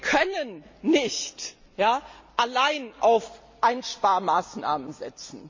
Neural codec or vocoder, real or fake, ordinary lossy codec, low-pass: none; real; MP3, 48 kbps; 7.2 kHz